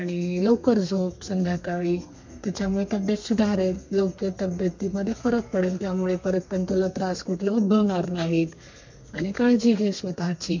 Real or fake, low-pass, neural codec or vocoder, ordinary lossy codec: fake; 7.2 kHz; codec, 32 kHz, 1.9 kbps, SNAC; MP3, 48 kbps